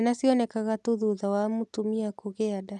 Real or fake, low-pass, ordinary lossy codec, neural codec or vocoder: real; none; none; none